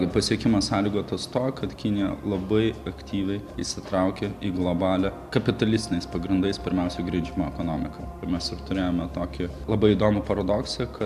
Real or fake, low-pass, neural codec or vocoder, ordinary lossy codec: real; 14.4 kHz; none; AAC, 96 kbps